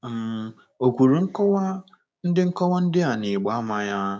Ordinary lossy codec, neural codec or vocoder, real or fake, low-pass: none; codec, 16 kHz, 6 kbps, DAC; fake; none